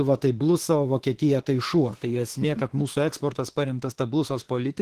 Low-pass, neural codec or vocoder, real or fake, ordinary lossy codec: 14.4 kHz; autoencoder, 48 kHz, 32 numbers a frame, DAC-VAE, trained on Japanese speech; fake; Opus, 16 kbps